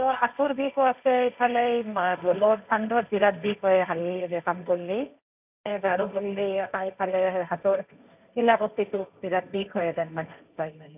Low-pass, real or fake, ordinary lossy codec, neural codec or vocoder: 3.6 kHz; fake; none; codec, 16 kHz, 1.1 kbps, Voila-Tokenizer